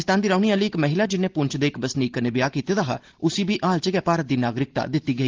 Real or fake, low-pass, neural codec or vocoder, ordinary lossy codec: real; 7.2 kHz; none; Opus, 16 kbps